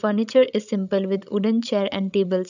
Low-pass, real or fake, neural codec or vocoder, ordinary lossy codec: 7.2 kHz; fake; codec, 16 kHz, 16 kbps, FreqCodec, larger model; none